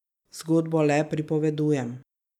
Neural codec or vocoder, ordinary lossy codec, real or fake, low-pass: none; none; real; 19.8 kHz